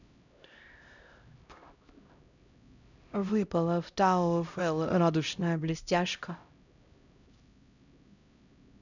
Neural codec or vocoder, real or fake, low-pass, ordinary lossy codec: codec, 16 kHz, 0.5 kbps, X-Codec, HuBERT features, trained on LibriSpeech; fake; 7.2 kHz; none